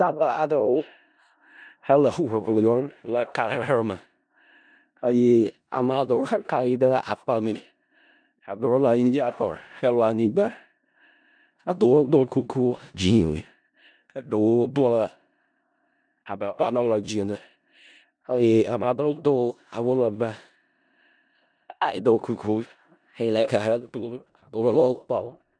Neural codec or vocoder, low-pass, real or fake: codec, 16 kHz in and 24 kHz out, 0.4 kbps, LongCat-Audio-Codec, four codebook decoder; 9.9 kHz; fake